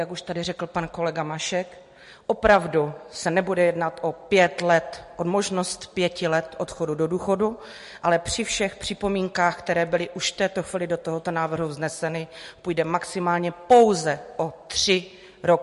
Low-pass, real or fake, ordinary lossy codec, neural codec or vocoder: 14.4 kHz; real; MP3, 48 kbps; none